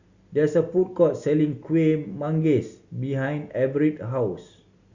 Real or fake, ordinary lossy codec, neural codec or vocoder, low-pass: real; Opus, 64 kbps; none; 7.2 kHz